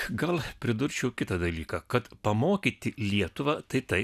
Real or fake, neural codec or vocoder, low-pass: real; none; 14.4 kHz